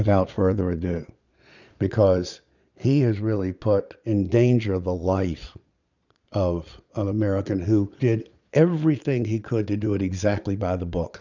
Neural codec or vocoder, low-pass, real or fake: vocoder, 22.05 kHz, 80 mel bands, Vocos; 7.2 kHz; fake